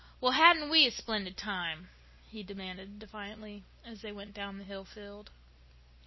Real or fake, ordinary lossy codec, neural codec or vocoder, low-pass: real; MP3, 24 kbps; none; 7.2 kHz